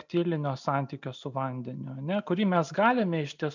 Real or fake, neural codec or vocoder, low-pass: real; none; 7.2 kHz